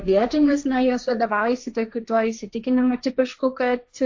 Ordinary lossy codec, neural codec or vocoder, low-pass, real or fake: AAC, 48 kbps; codec, 16 kHz, 1.1 kbps, Voila-Tokenizer; 7.2 kHz; fake